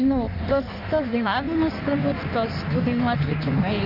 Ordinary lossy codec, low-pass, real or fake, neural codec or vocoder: MP3, 48 kbps; 5.4 kHz; fake; codec, 16 kHz in and 24 kHz out, 1.1 kbps, FireRedTTS-2 codec